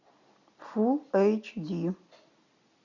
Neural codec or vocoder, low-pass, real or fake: none; 7.2 kHz; real